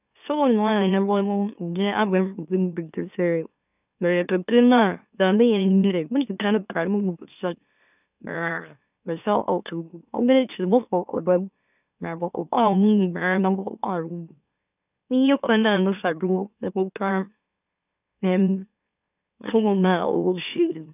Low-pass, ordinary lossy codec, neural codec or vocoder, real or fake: 3.6 kHz; none; autoencoder, 44.1 kHz, a latent of 192 numbers a frame, MeloTTS; fake